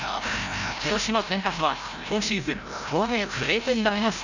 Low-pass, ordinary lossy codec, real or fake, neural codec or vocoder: 7.2 kHz; none; fake; codec, 16 kHz, 0.5 kbps, FreqCodec, larger model